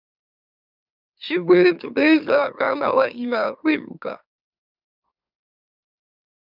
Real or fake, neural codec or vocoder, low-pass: fake; autoencoder, 44.1 kHz, a latent of 192 numbers a frame, MeloTTS; 5.4 kHz